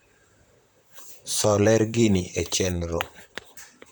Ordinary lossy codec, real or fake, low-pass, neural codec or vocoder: none; fake; none; vocoder, 44.1 kHz, 128 mel bands, Pupu-Vocoder